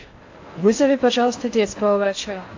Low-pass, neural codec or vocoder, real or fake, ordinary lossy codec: 7.2 kHz; codec, 16 kHz in and 24 kHz out, 0.6 kbps, FocalCodec, streaming, 4096 codes; fake; AAC, 48 kbps